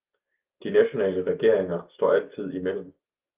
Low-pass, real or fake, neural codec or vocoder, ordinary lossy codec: 3.6 kHz; real; none; Opus, 24 kbps